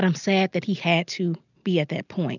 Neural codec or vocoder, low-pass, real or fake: none; 7.2 kHz; real